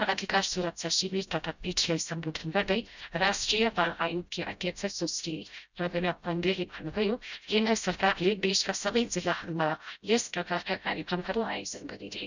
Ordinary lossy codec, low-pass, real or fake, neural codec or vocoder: none; 7.2 kHz; fake; codec, 16 kHz, 0.5 kbps, FreqCodec, smaller model